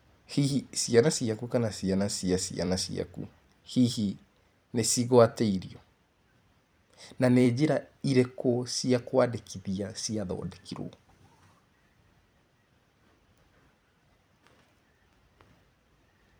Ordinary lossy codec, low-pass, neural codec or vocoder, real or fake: none; none; vocoder, 44.1 kHz, 128 mel bands every 256 samples, BigVGAN v2; fake